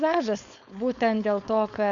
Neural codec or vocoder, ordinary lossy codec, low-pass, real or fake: codec, 16 kHz, 4.8 kbps, FACodec; MP3, 64 kbps; 7.2 kHz; fake